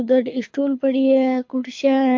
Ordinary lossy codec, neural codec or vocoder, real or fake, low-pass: MP3, 48 kbps; codec, 24 kHz, 6 kbps, HILCodec; fake; 7.2 kHz